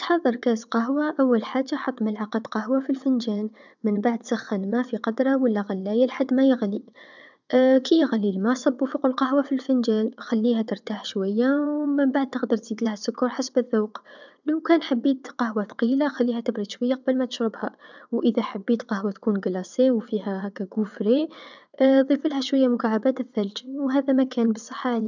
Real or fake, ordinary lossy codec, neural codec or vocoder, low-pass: fake; none; vocoder, 22.05 kHz, 80 mel bands, WaveNeXt; 7.2 kHz